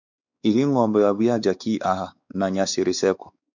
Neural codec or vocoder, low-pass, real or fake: codec, 16 kHz, 4 kbps, X-Codec, WavLM features, trained on Multilingual LibriSpeech; 7.2 kHz; fake